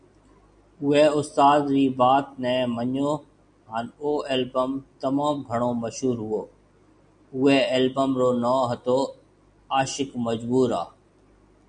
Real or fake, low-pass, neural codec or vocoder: real; 9.9 kHz; none